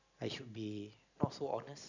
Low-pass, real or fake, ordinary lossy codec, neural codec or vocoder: 7.2 kHz; real; none; none